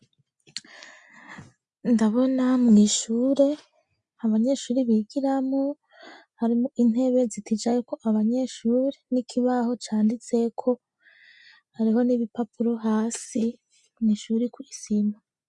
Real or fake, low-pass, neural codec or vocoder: real; 10.8 kHz; none